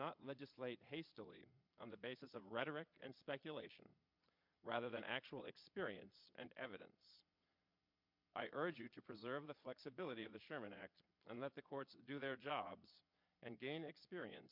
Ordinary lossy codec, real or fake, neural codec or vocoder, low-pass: MP3, 48 kbps; fake; vocoder, 22.05 kHz, 80 mel bands, Vocos; 5.4 kHz